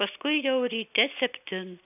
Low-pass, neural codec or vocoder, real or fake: 3.6 kHz; none; real